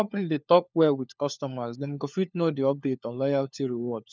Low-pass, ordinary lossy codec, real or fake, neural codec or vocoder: none; none; fake; codec, 16 kHz, 4 kbps, FunCodec, trained on LibriTTS, 50 frames a second